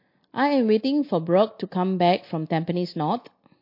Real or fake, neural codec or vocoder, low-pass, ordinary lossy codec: real; none; 5.4 kHz; MP3, 32 kbps